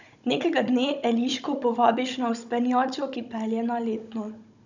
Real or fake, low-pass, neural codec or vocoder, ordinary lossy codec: fake; 7.2 kHz; codec, 16 kHz, 16 kbps, FunCodec, trained on Chinese and English, 50 frames a second; none